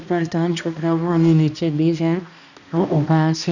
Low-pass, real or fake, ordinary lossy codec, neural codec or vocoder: 7.2 kHz; fake; none; codec, 16 kHz, 1 kbps, X-Codec, HuBERT features, trained on balanced general audio